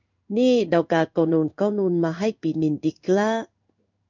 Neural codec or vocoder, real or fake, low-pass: codec, 16 kHz in and 24 kHz out, 1 kbps, XY-Tokenizer; fake; 7.2 kHz